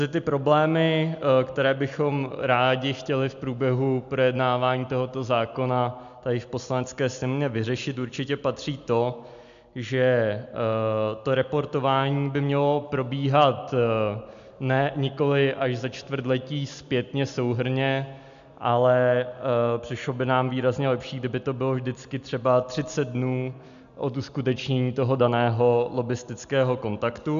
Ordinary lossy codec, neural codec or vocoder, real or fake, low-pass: MP3, 64 kbps; none; real; 7.2 kHz